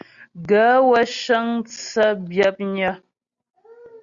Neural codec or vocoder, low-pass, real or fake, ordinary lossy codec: none; 7.2 kHz; real; Opus, 64 kbps